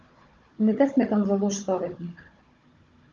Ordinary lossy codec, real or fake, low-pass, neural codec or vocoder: Opus, 32 kbps; fake; 7.2 kHz; codec, 16 kHz, 16 kbps, FunCodec, trained on Chinese and English, 50 frames a second